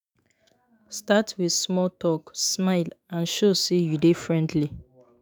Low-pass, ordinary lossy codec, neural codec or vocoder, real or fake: none; none; autoencoder, 48 kHz, 128 numbers a frame, DAC-VAE, trained on Japanese speech; fake